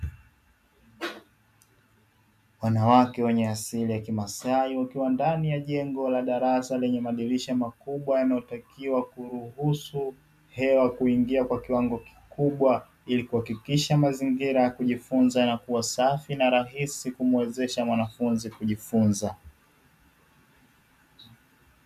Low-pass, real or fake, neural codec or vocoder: 14.4 kHz; real; none